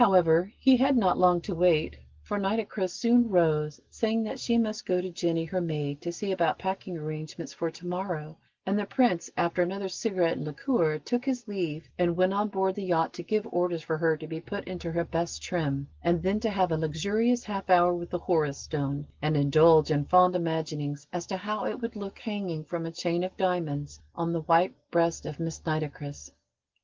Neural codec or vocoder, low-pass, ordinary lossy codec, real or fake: none; 7.2 kHz; Opus, 16 kbps; real